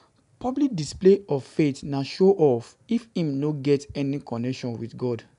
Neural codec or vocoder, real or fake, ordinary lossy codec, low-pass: none; real; none; 10.8 kHz